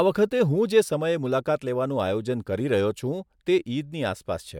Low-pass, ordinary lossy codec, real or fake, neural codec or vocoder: 19.8 kHz; MP3, 96 kbps; real; none